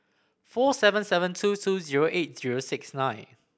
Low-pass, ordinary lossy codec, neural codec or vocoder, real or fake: none; none; none; real